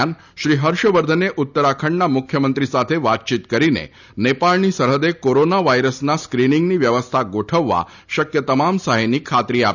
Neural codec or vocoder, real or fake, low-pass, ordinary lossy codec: none; real; 7.2 kHz; none